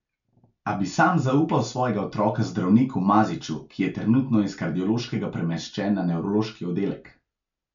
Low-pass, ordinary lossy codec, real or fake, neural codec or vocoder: 7.2 kHz; none; real; none